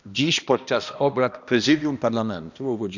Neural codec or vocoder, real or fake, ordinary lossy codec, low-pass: codec, 16 kHz, 1 kbps, X-Codec, HuBERT features, trained on balanced general audio; fake; none; 7.2 kHz